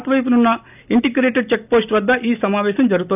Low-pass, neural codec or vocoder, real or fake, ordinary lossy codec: 3.6 kHz; none; real; none